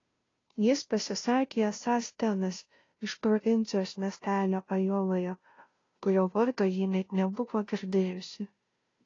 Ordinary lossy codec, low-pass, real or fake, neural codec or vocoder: AAC, 32 kbps; 7.2 kHz; fake; codec, 16 kHz, 0.5 kbps, FunCodec, trained on Chinese and English, 25 frames a second